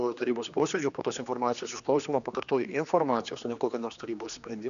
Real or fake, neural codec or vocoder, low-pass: fake; codec, 16 kHz, 2 kbps, X-Codec, HuBERT features, trained on general audio; 7.2 kHz